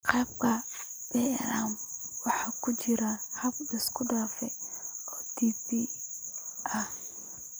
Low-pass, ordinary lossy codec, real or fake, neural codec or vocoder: none; none; real; none